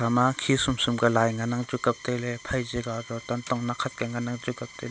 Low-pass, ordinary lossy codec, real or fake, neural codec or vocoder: none; none; real; none